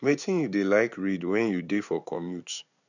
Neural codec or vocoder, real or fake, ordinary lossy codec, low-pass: codec, 16 kHz in and 24 kHz out, 1 kbps, XY-Tokenizer; fake; none; 7.2 kHz